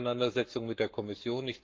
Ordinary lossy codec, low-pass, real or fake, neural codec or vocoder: Opus, 24 kbps; 7.2 kHz; fake; codec, 44.1 kHz, 7.8 kbps, Pupu-Codec